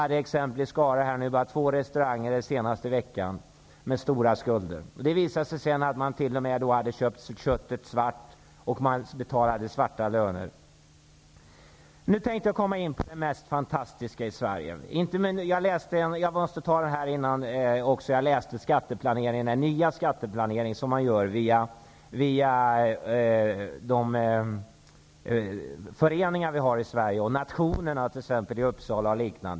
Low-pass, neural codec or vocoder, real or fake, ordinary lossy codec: none; none; real; none